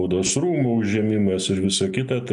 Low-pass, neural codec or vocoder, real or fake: 10.8 kHz; none; real